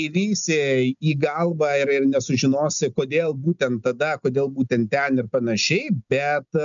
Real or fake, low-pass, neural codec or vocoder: real; 7.2 kHz; none